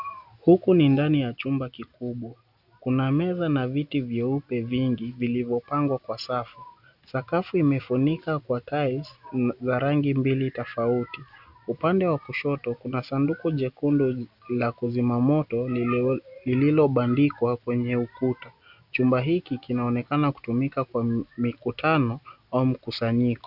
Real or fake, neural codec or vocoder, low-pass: real; none; 5.4 kHz